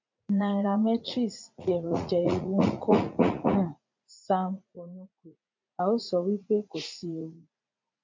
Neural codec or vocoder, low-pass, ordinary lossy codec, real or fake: vocoder, 44.1 kHz, 80 mel bands, Vocos; 7.2 kHz; MP3, 64 kbps; fake